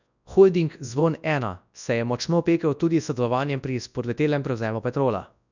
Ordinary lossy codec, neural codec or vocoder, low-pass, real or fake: none; codec, 24 kHz, 0.9 kbps, WavTokenizer, large speech release; 7.2 kHz; fake